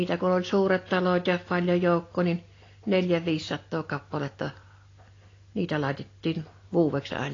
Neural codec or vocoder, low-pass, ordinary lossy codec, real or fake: none; 7.2 kHz; AAC, 32 kbps; real